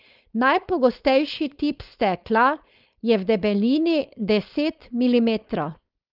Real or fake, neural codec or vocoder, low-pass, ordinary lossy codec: fake; codec, 16 kHz, 4.8 kbps, FACodec; 5.4 kHz; Opus, 24 kbps